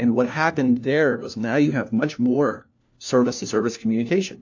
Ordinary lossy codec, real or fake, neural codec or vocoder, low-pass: AAC, 48 kbps; fake; codec, 16 kHz, 1 kbps, FunCodec, trained on LibriTTS, 50 frames a second; 7.2 kHz